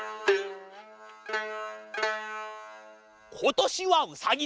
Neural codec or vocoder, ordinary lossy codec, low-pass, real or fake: none; none; none; real